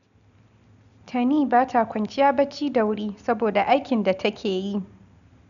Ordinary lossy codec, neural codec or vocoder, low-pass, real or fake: none; none; 7.2 kHz; real